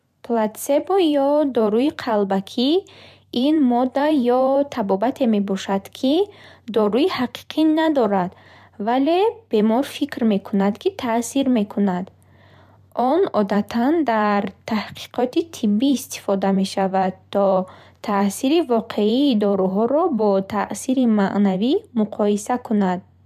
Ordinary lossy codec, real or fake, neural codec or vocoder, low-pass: none; fake; vocoder, 44.1 kHz, 128 mel bands every 512 samples, BigVGAN v2; 14.4 kHz